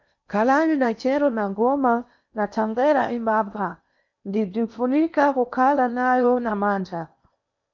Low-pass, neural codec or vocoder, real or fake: 7.2 kHz; codec, 16 kHz in and 24 kHz out, 0.8 kbps, FocalCodec, streaming, 65536 codes; fake